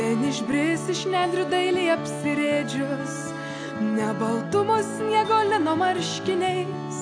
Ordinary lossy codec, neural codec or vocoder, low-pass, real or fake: AAC, 64 kbps; none; 9.9 kHz; real